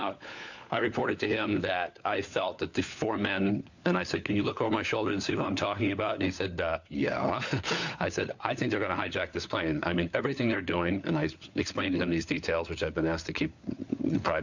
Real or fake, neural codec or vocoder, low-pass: fake; codec, 16 kHz, 4 kbps, FunCodec, trained on LibriTTS, 50 frames a second; 7.2 kHz